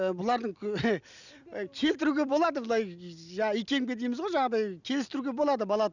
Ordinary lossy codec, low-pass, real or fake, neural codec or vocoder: none; 7.2 kHz; real; none